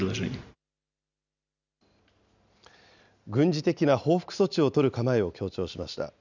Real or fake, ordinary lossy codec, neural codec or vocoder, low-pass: real; none; none; 7.2 kHz